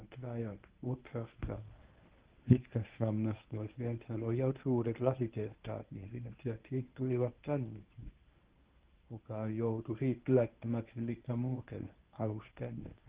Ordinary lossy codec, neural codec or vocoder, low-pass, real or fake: Opus, 16 kbps; codec, 24 kHz, 0.9 kbps, WavTokenizer, medium speech release version 1; 3.6 kHz; fake